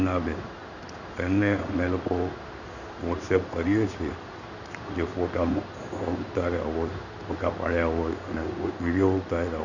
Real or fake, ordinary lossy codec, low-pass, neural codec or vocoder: fake; none; 7.2 kHz; codec, 16 kHz in and 24 kHz out, 1 kbps, XY-Tokenizer